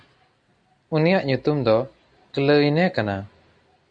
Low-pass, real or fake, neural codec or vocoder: 9.9 kHz; real; none